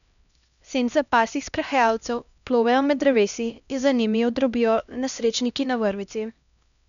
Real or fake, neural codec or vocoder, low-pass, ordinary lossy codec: fake; codec, 16 kHz, 1 kbps, X-Codec, HuBERT features, trained on LibriSpeech; 7.2 kHz; none